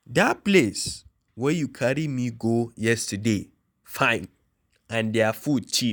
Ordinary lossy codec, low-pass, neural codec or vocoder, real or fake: none; none; none; real